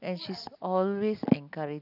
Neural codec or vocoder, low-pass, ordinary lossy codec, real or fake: none; 5.4 kHz; none; real